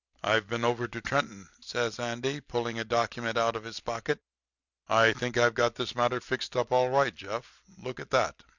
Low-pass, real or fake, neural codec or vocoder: 7.2 kHz; real; none